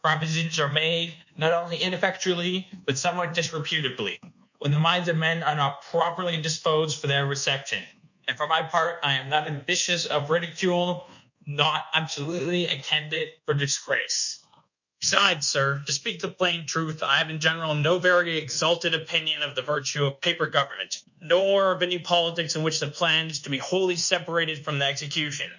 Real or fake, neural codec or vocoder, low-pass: fake; codec, 24 kHz, 1.2 kbps, DualCodec; 7.2 kHz